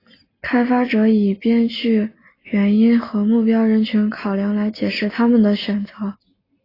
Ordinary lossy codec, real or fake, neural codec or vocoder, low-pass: AAC, 24 kbps; real; none; 5.4 kHz